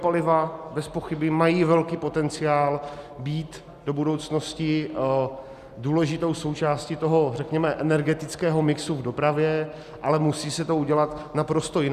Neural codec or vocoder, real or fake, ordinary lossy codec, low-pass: none; real; Opus, 64 kbps; 14.4 kHz